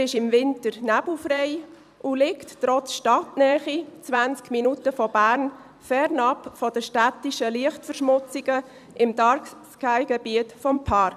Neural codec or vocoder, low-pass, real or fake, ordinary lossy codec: vocoder, 44.1 kHz, 128 mel bands every 256 samples, BigVGAN v2; 14.4 kHz; fake; none